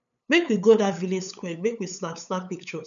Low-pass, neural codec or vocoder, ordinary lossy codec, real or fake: 7.2 kHz; codec, 16 kHz, 8 kbps, FunCodec, trained on LibriTTS, 25 frames a second; none; fake